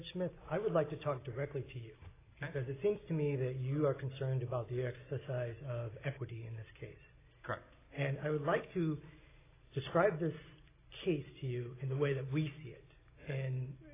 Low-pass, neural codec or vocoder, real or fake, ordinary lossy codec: 3.6 kHz; none; real; AAC, 16 kbps